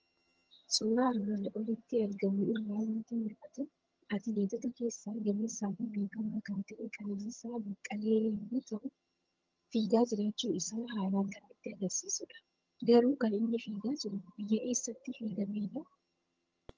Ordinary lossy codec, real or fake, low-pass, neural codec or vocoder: Opus, 24 kbps; fake; 7.2 kHz; vocoder, 22.05 kHz, 80 mel bands, HiFi-GAN